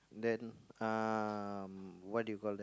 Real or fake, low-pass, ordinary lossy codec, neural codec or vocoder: real; none; none; none